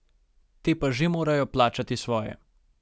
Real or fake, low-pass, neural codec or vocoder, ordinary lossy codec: real; none; none; none